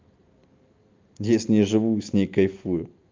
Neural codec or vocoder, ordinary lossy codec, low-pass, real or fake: none; Opus, 24 kbps; 7.2 kHz; real